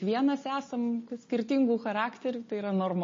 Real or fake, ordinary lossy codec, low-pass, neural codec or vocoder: real; MP3, 32 kbps; 7.2 kHz; none